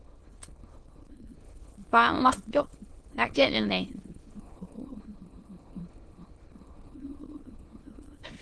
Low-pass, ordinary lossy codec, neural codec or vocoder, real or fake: 9.9 kHz; Opus, 16 kbps; autoencoder, 22.05 kHz, a latent of 192 numbers a frame, VITS, trained on many speakers; fake